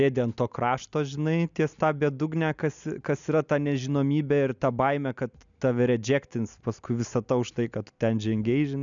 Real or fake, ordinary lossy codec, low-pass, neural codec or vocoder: real; MP3, 96 kbps; 7.2 kHz; none